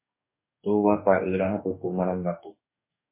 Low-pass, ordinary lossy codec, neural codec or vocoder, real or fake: 3.6 kHz; MP3, 24 kbps; codec, 44.1 kHz, 2.6 kbps, DAC; fake